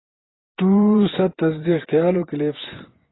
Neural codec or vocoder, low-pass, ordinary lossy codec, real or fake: vocoder, 24 kHz, 100 mel bands, Vocos; 7.2 kHz; AAC, 16 kbps; fake